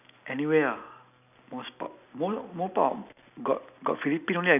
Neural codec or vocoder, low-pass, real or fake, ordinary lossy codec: none; 3.6 kHz; real; none